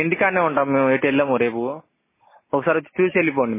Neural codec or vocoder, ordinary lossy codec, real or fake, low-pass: none; MP3, 16 kbps; real; 3.6 kHz